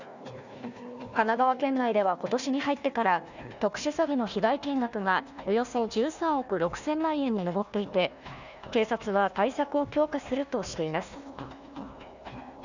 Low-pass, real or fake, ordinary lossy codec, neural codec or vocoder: 7.2 kHz; fake; none; codec, 16 kHz, 1 kbps, FunCodec, trained on Chinese and English, 50 frames a second